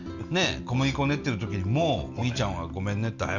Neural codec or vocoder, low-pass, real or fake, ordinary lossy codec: none; 7.2 kHz; real; none